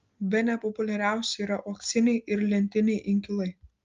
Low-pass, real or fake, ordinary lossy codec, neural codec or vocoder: 7.2 kHz; real; Opus, 32 kbps; none